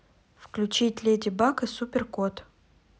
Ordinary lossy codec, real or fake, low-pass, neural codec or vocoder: none; real; none; none